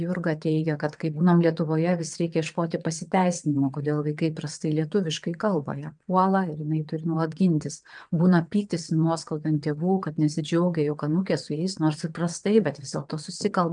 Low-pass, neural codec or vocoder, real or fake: 9.9 kHz; vocoder, 22.05 kHz, 80 mel bands, WaveNeXt; fake